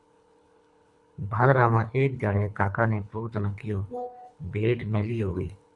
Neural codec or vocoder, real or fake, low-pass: codec, 24 kHz, 3 kbps, HILCodec; fake; 10.8 kHz